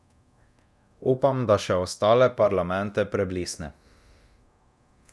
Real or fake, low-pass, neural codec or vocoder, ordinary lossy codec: fake; none; codec, 24 kHz, 0.9 kbps, DualCodec; none